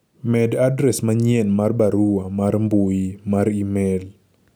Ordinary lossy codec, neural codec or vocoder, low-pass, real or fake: none; none; none; real